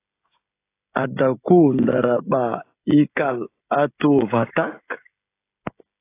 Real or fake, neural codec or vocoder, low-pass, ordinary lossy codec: fake; codec, 16 kHz, 16 kbps, FreqCodec, smaller model; 3.6 kHz; AAC, 24 kbps